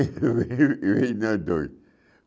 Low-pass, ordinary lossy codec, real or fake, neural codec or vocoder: none; none; real; none